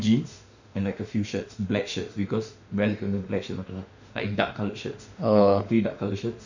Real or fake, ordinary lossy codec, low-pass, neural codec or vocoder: fake; none; 7.2 kHz; autoencoder, 48 kHz, 32 numbers a frame, DAC-VAE, trained on Japanese speech